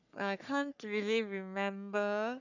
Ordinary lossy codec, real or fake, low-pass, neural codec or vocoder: none; fake; 7.2 kHz; codec, 44.1 kHz, 3.4 kbps, Pupu-Codec